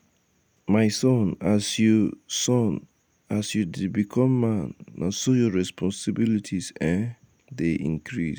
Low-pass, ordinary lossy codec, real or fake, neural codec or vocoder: none; none; real; none